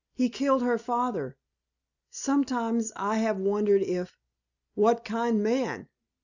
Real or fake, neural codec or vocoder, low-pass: real; none; 7.2 kHz